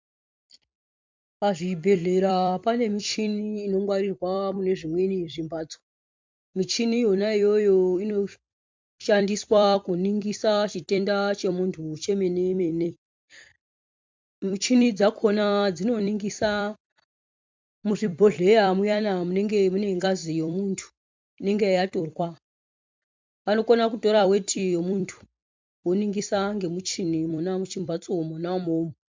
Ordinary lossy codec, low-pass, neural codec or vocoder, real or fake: MP3, 64 kbps; 7.2 kHz; vocoder, 44.1 kHz, 128 mel bands every 256 samples, BigVGAN v2; fake